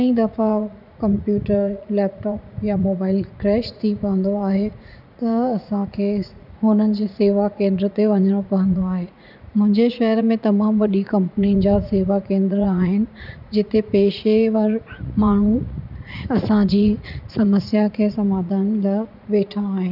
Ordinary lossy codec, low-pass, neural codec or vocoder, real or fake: none; 5.4 kHz; vocoder, 44.1 kHz, 80 mel bands, Vocos; fake